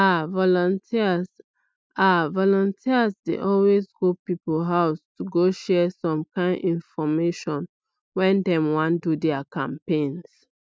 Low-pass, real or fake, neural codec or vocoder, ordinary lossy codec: none; real; none; none